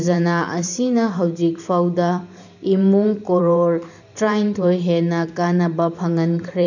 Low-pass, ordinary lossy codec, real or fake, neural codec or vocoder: 7.2 kHz; none; fake; vocoder, 44.1 kHz, 128 mel bands every 512 samples, BigVGAN v2